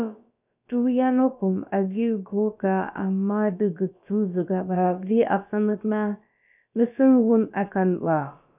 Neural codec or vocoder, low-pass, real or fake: codec, 16 kHz, about 1 kbps, DyCAST, with the encoder's durations; 3.6 kHz; fake